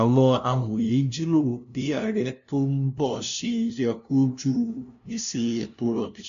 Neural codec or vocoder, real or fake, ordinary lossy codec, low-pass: codec, 16 kHz, 0.5 kbps, FunCodec, trained on LibriTTS, 25 frames a second; fake; none; 7.2 kHz